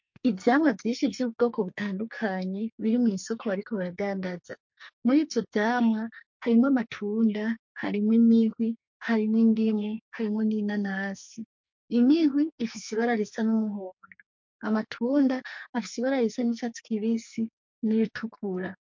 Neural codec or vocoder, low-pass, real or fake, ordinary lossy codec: codec, 44.1 kHz, 2.6 kbps, SNAC; 7.2 kHz; fake; MP3, 48 kbps